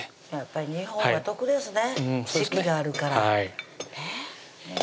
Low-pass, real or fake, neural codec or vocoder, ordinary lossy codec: none; real; none; none